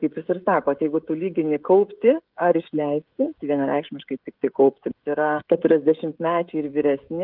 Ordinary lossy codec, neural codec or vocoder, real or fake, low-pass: Opus, 32 kbps; codec, 16 kHz, 16 kbps, FreqCodec, smaller model; fake; 5.4 kHz